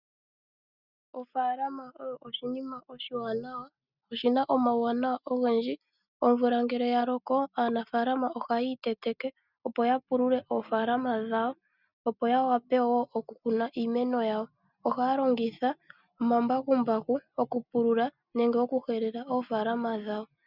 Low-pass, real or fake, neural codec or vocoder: 5.4 kHz; real; none